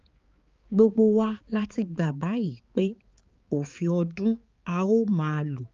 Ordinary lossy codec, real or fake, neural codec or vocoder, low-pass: Opus, 32 kbps; fake; codec, 16 kHz, 4 kbps, X-Codec, HuBERT features, trained on balanced general audio; 7.2 kHz